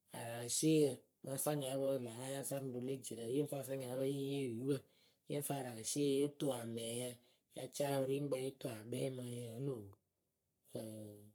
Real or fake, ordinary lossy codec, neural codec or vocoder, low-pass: fake; none; codec, 44.1 kHz, 3.4 kbps, Pupu-Codec; none